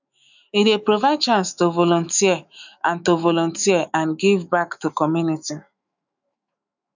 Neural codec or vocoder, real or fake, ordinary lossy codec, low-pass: autoencoder, 48 kHz, 128 numbers a frame, DAC-VAE, trained on Japanese speech; fake; none; 7.2 kHz